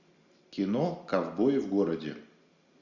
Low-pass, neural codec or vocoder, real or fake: 7.2 kHz; none; real